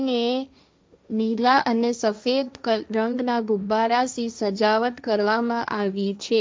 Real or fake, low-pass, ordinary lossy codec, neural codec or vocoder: fake; none; none; codec, 16 kHz, 1.1 kbps, Voila-Tokenizer